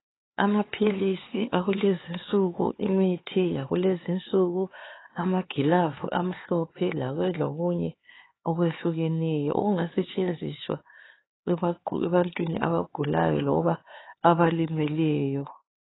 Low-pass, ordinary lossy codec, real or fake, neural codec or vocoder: 7.2 kHz; AAC, 16 kbps; fake; codec, 16 kHz, 4 kbps, X-Codec, HuBERT features, trained on LibriSpeech